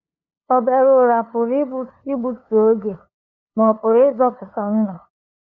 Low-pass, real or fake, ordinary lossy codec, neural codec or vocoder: 7.2 kHz; fake; none; codec, 16 kHz, 2 kbps, FunCodec, trained on LibriTTS, 25 frames a second